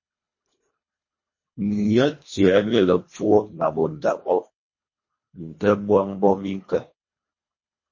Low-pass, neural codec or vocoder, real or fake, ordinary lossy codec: 7.2 kHz; codec, 24 kHz, 1.5 kbps, HILCodec; fake; MP3, 32 kbps